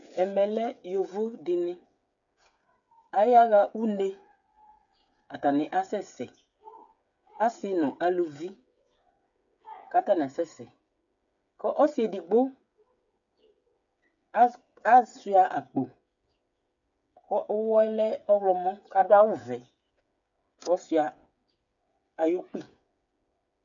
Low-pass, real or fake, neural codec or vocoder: 7.2 kHz; fake; codec, 16 kHz, 8 kbps, FreqCodec, smaller model